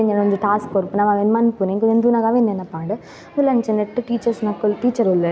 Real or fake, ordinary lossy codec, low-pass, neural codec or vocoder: real; none; none; none